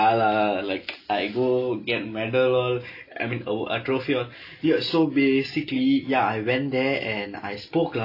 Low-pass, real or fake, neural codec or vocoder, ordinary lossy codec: 5.4 kHz; real; none; AAC, 32 kbps